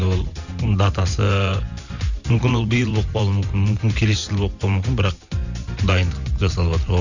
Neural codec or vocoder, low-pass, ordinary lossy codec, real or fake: vocoder, 44.1 kHz, 128 mel bands every 512 samples, BigVGAN v2; 7.2 kHz; none; fake